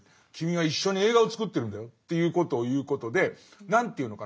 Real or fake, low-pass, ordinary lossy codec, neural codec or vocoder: real; none; none; none